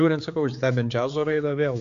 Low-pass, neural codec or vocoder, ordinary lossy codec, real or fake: 7.2 kHz; codec, 16 kHz, 4 kbps, X-Codec, HuBERT features, trained on general audio; AAC, 64 kbps; fake